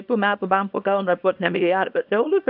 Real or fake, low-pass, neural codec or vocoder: fake; 5.4 kHz; codec, 24 kHz, 0.9 kbps, WavTokenizer, small release